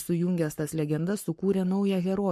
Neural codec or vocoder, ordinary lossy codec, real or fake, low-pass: codec, 44.1 kHz, 7.8 kbps, Pupu-Codec; MP3, 64 kbps; fake; 14.4 kHz